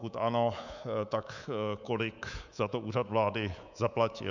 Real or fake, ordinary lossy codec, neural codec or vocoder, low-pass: fake; Opus, 64 kbps; codec, 24 kHz, 3.1 kbps, DualCodec; 7.2 kHz